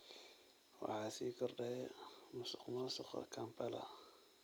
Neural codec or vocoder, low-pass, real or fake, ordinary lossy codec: none; none; real; none